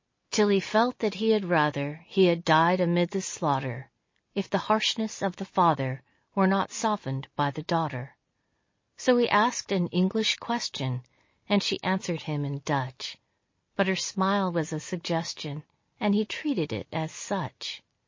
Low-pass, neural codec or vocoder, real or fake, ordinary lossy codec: 7.2 kHz; none; real; MP3, 32 kbps